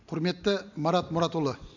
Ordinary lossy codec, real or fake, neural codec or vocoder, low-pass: none; real; none; 7.2 kHz